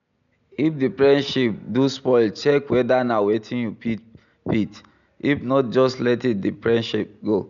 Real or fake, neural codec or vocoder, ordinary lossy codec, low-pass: real; none; none; 7.2 kHz